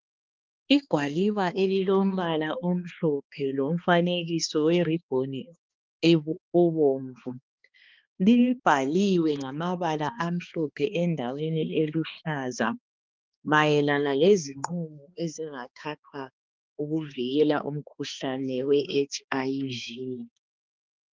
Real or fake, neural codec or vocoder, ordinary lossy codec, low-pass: fake; codec, 16 kHz, 2 kbps, X-Codec, HuBERT features, trained on balanced general audio; Opus, 32 kbps; 7.2 kHz